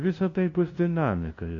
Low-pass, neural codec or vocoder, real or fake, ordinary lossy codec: 7.2 kHz; codec, 16 kHz, 0.5 kbps, FunCodec, trained on LibriTTS, 25 frames a second; fake; AAC, 32 kbps